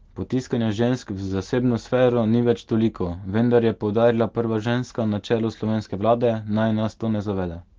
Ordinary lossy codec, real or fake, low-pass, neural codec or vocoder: Opus, 16 kbps; real; 7.2 kHz; none